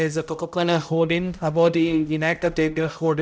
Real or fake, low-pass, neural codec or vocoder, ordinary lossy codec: fake; none; codec, 16 kHz, 0.5 kbps, X-Codec, HuBERT features, trained on balanced general audio; none